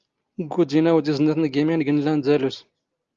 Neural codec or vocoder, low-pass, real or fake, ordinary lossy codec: none; 7.2 kHz; real; Opus, 32 kbps